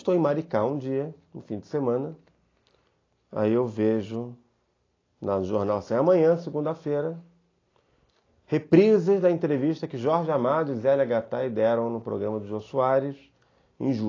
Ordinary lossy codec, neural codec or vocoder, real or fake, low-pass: AAC, 32 kbps; none; real; 7.2 kHz